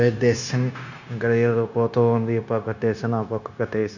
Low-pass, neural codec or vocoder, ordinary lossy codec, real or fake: 7.2 kHz; codec, 16 kHz, 0.9 kbps, LongCat-Audio-Codec; none; fake